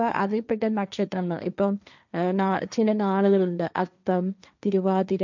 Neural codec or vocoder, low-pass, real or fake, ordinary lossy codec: codec, 16 kHz, 1.1 kbps, Voila-Tokenizer; 7.2 kHz; fake; none